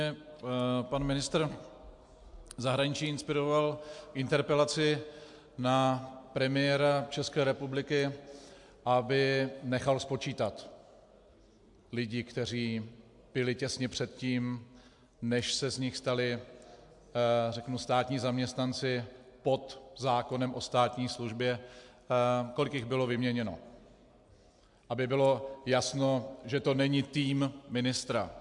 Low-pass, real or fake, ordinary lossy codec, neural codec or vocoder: 10.8 kHz; real; MP3, 64 kbps; none